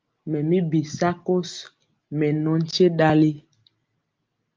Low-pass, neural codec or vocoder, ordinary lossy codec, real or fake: 7.2 kHz; none; Opus, 32 kbps; real